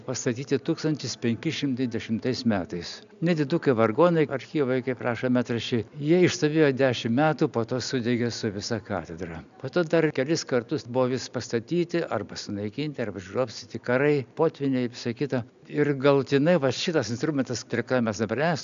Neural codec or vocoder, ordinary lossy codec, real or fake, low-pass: none; AAC, 96 kbps; real; 7.2 kHz